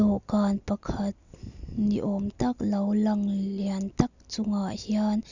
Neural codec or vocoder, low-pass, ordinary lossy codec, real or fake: none; 7.2 kHz; none; real